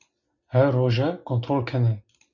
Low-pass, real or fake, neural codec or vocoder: 7.2 kHz; real; none